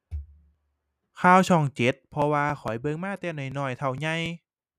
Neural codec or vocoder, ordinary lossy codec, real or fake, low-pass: none; none; real; 14.4 kHz